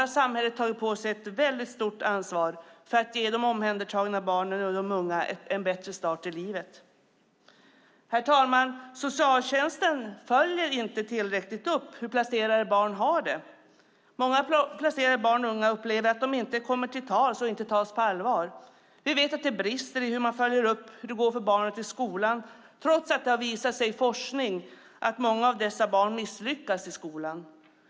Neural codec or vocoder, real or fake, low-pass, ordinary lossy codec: none; real; none; none